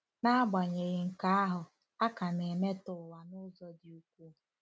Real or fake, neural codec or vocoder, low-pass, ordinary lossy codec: real; none; none; none